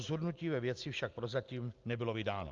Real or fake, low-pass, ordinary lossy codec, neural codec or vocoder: real; 7.2 kHz; Opus, 32 kbps; none